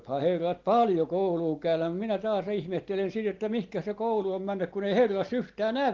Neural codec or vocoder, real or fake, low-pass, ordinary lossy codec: none; real; 7.2 kHz; Opus, 16 kbps